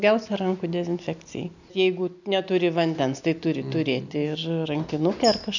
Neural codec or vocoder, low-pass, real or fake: none; 7.2 kHz; real